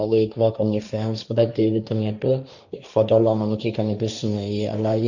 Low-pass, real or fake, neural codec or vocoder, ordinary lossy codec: 7.2 kHz; fake; codec, 16 kHz, 1.1 kbps, Voila-Tokenizer; none